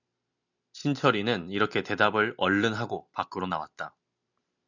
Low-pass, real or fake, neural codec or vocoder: 7.2 kHz; real; none